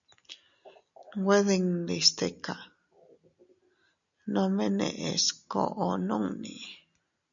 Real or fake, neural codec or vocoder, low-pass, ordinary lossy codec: real; none; 7.2 kHz; MP3, 96 kbps